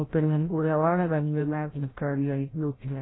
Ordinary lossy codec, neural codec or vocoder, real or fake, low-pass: AAC, 16 kbps; codec, 16 kHz, 0.5 kbps, FreqCodec, larger model; fake; 7.2 kHz